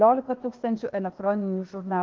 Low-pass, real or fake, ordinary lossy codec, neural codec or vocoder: 7.2 kHz; fake; Opus, 16 kbps; codec, 16 kHz, 0.8 kbps, ZipCodec